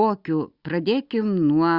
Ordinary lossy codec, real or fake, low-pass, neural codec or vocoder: Opus, 64 kbps; real; 5.4 kHz; none